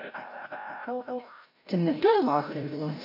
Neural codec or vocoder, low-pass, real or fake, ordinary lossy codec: codec, 16 kHz, 0.5 kbps, FreqCodec, larger model; 5.4 kHz; fake; AAC, 32 kbps